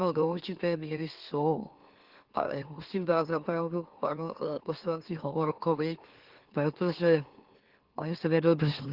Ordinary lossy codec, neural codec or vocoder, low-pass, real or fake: Opus, 16 kbps; autoencoder, 44.1 kHz, a latent of 192 numbers a frame, MeloTTS; 5.4 kHz; fake